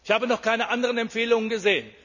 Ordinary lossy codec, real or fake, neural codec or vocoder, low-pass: none; real; none; 7.2 kHz